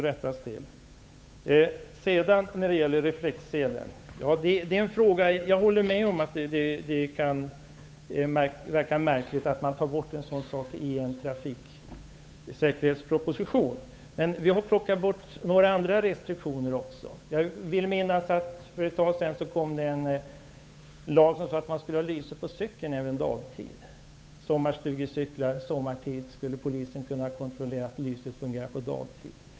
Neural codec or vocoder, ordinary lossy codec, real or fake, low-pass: codec, 16 kHz, 8 kbps, FunCodec, trained on Chinese and English, 25 frames a second; none; fake; none